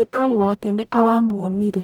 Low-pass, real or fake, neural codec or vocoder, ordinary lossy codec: none; fake; codec, 44.1 kHz, 0.9 kbps, DAC; none